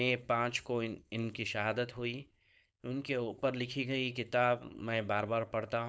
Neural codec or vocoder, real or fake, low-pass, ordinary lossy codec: codec, 16 kHz, 4.8 kbps, FACodec; fake; none; none